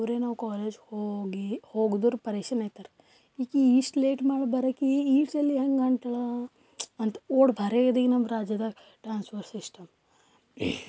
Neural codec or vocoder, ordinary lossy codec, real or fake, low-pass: none; none; real; none